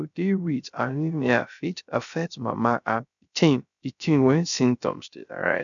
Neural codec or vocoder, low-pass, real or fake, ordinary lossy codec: codec, 16 kHz, 0.3 kbps, FocalCodec; 7.2 kHz; fake; none